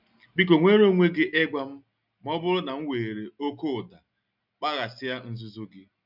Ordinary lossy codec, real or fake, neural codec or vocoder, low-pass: none; real; none; 5.4 kHz